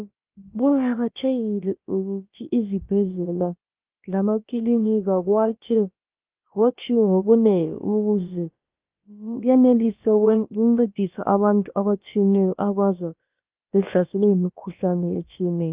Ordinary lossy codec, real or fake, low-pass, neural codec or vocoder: Opus, 32 kbps; fake; 3.6 kHz; codec, 16 kHz, about 1 kbps, DyCAST, with the encoder's durations